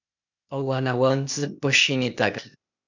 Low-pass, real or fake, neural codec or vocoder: 7.2 kHz; fake; codec, 16 kHz, 0.8 kbps, ZipCodec